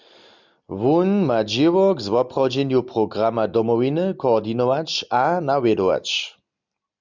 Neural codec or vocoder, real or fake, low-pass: none; real; 7.2 kHz